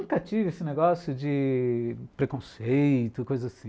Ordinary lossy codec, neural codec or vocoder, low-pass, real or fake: none; none; none; real